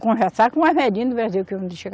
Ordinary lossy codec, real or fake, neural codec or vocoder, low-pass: none; real; none; none